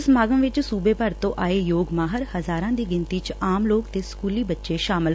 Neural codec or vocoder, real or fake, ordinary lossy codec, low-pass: none; real; none; none